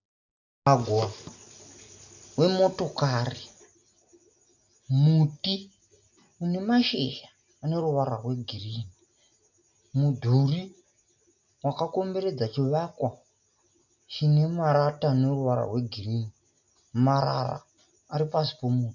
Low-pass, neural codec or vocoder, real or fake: 7.2 kHz; none; real